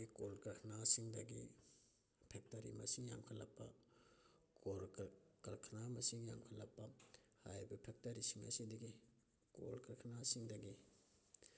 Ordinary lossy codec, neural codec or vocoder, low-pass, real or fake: none; none; none; real